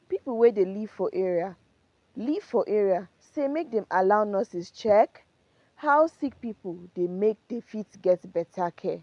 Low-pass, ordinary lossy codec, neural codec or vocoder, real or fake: 10.8 kHz; AAC, 64 kbps; none; real